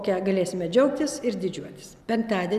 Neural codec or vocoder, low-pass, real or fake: none; 14.4 kHz; real